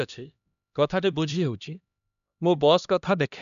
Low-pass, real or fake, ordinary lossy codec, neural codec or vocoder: 7.2 kHz; fake; none; codec, 16 kHz, 1 kbps, X-Codec, HuBERT features, trained on LibriSpeech